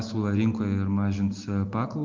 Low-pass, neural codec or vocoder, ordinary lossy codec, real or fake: 7.2 kHz; none; Opus, 32 kbps; real